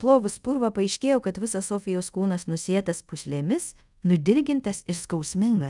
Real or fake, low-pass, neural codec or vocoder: fake; 10.8 kHz; codec, 24 kHz, 0.5 kbps, DualCodec